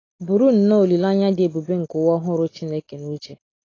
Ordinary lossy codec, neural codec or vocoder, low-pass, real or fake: AAC, 32 kbps; none; 7.2 kHz; real